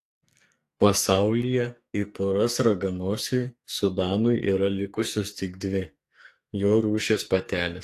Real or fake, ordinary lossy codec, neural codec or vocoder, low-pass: fake; AAC, 64 kbps; codec, 44.1 kHz, 2.6 kbps, SNAC; 14.4 kHz